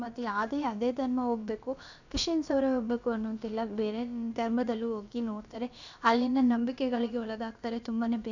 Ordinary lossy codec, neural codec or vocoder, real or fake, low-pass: none; codec, 16 kHz, about 1 kbps, DyCAST, with the encoder's durations; fake; 7.2 kHz